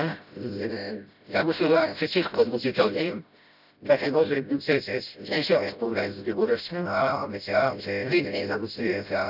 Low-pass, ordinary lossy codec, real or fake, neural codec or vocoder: 5.4 kHz; none; fake; codec, 16 kHz, 0.5 kbps, FreqCodec, smaller model